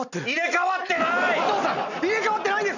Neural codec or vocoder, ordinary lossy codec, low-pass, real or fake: none; none; 7.2 kHz; real